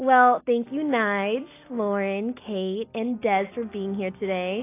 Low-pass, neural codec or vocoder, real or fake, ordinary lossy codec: 3.6 kHz; none; real; AAC, 24 kbps